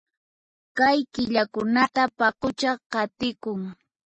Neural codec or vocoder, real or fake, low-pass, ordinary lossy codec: none; real; 10.8 kHz; MP3, 32 kbps